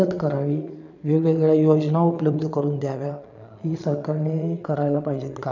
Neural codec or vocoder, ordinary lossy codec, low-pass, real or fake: vocoder, 22.05 kHz, 80 mel bands, Vocos; none; 7.2 kHz; fake